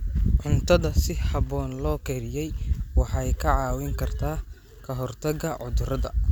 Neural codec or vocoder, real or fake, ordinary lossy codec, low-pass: none; real; none; none